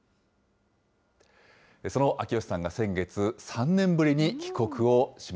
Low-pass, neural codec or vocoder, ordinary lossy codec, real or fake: none; none; none; real